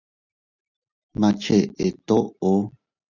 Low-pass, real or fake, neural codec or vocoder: 7.2 kHz; real; none